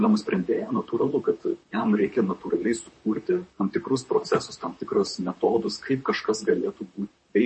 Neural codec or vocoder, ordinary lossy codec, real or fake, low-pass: vocoder, 44.1 kHz, 128 mel bands, Pupu-Vocoder; MP3, 32 kbps; fake; 10.8 kHz